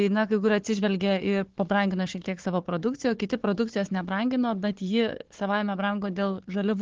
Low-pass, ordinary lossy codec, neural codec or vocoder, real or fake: 7.2 kHz; Opus, 16 kbps; codec, 16 kHz, 4 kbps, FunCodec, trained on Chinese and English, 50 frames a second; fake